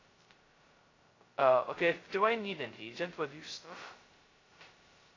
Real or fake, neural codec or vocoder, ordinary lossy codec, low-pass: fake; codec, 16 kHz, 0.2 kbps, FocalCodec; AAC, 32 kbps; 7.2 kHz